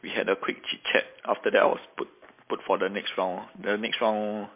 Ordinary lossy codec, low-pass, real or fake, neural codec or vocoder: MP3, 24 kbps; 3.6 kHz; real; none